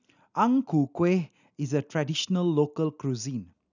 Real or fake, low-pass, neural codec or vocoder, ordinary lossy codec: real; 7.2 kHz; none; none